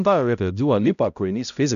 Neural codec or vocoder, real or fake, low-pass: codec, 16 kHz, 0.5 kbps, X-Codec, HuBERT features, trained on balanced general audio; fake; 7.2 kHz